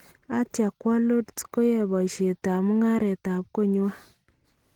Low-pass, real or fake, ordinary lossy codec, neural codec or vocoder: 19.8 kHz; real; Opus, 16 kbps; none